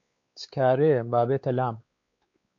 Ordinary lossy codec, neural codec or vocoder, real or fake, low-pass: AAC, 64 kbps; codec, 16 kHz, 4 kbps, X-Codec, WavLM features, trained on Multilingual LibriSpeech; fake; 7.2 kHz